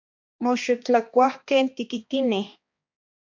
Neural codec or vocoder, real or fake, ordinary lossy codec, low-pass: codec, 16 kHz, 2 kbps, X-Codec, HuBERT features, trained on balanced general audio; fake; MP3, 48 kbps; 7.2 kHz